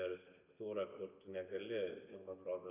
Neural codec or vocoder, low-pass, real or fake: none; 3.6 kHz; real